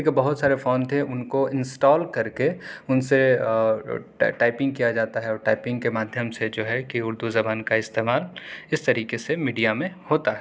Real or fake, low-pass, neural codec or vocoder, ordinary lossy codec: real; none; none; none